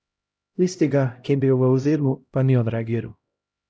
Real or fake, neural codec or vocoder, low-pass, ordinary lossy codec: fake; codec, 16 kHz, 0.5 kbps, X-Codec, HuBERT features, trained on LibriSpeech; none; none